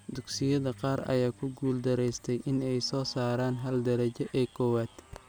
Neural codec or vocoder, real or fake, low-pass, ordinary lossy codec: none; real; none; none